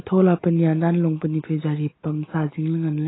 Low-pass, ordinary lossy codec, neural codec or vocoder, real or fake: 7.2 kHz; AAC, 16 kbps; none; real